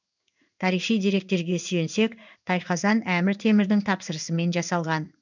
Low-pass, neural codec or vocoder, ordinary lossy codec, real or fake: 7.2 kHz; codec, 16 kHz in and 24 kHz out, 1 kbps, XY-Tokenizer; none; fake